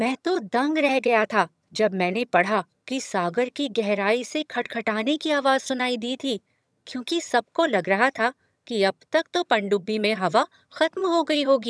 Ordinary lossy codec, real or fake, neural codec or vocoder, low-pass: none; fake; vocoder, 22.05 kHz, 80 mel bands, HiFi-GAN; none